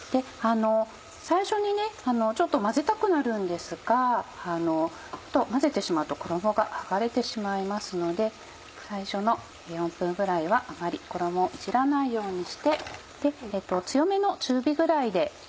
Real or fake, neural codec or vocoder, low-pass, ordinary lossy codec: real; none; none; none